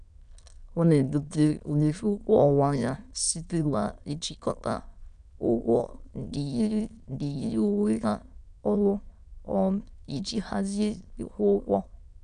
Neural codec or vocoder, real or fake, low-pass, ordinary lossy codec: autoencoder, 22.05 kHz, a latent of 192 numbers a frame, VITS, trained on many speakers; fake; 9.9 kHz; none